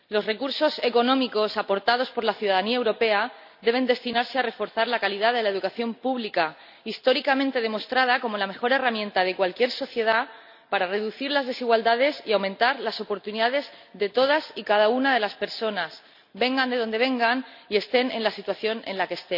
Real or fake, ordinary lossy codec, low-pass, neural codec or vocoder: real; none; 5.4 kHz; none